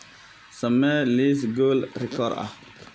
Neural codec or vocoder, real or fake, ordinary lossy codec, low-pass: none; real; none; none